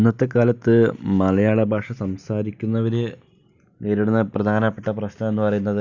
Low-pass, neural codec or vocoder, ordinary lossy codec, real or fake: 7.2 kHz; none; none; real